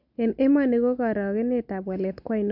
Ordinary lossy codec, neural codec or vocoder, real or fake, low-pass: none; none; real; 5.4 kHz